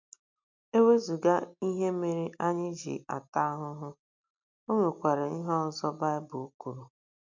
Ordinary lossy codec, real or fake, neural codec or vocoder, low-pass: MP3, 64 kbps; real; none; 7.2 kHz